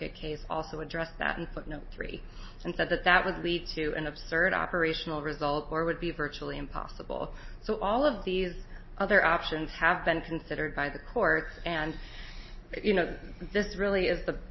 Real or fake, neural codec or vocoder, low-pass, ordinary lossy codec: real; none; 7.2 kHz; MP3, 24 kbps